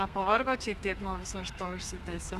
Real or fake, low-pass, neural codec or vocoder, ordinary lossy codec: fake; 14.4 kHz; codec, 32 kHz, 1.9 kbps, SNAC; Opus, 64 kbps